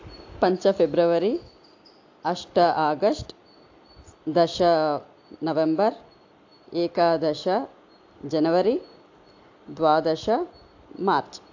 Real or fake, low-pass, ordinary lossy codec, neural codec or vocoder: real; 7.2 kHz; AAC, 48 kbps; none